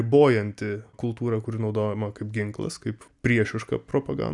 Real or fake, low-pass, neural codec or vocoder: real; 10.8 kHz; none